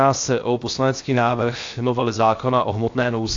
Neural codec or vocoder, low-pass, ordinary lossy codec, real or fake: codec, 16 kHz, 0.7 kbps, FocalCodec; 7.2 kHz; AAC, 48 kbps; fake